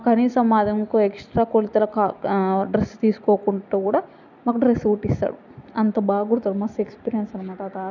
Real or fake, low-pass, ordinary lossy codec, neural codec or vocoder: real; 7.2 kHz; none; none